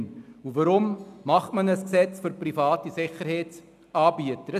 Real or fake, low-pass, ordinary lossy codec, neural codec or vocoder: real; 14.4 kHz; none; none